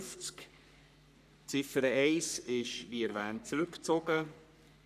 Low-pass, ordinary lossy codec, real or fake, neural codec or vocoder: 14.4 kHz; none; fake; codec, 44.1 kHz, 3.4 kbps, Pupu-Codec